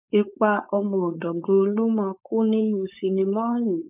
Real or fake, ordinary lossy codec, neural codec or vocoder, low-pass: fake; none; codec, 16 kHz, 4.8 kbps, FACodec; 3.6 kHz